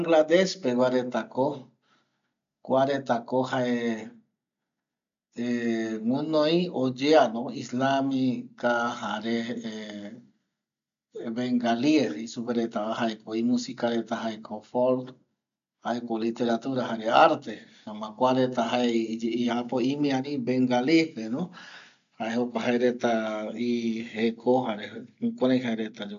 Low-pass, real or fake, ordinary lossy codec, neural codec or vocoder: 7.2 kHz; real; MP3, 64 kbps; none